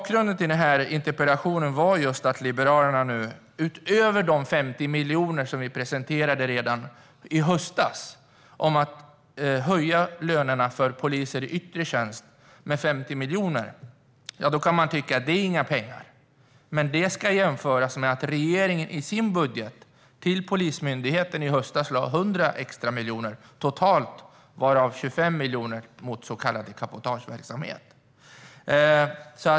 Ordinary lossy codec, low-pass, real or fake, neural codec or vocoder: none; none; real; none